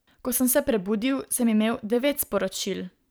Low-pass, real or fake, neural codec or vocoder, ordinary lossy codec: none; fake; vocoder, 44.1 kHz, 128 mel bands every 512 samples, BigVGAN v2; none